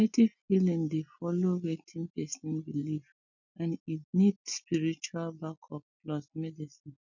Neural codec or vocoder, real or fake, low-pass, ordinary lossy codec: none; real; 7.2 kHz; none